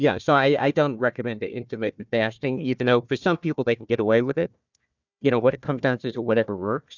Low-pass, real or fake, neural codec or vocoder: 7.2 kHz; fake; codec, 16 kHz, 1 kbps, FunCodec, trained on Chinese and English, 50 frames a second